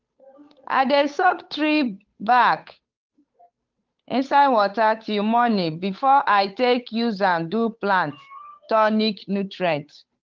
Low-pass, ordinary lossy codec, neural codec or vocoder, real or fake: 7.2 kHz; Opus, 32 kbps; codec, 16 kHz, 8 kbps, FunCodec, trained on Chinese and English, 25 frames a second; fake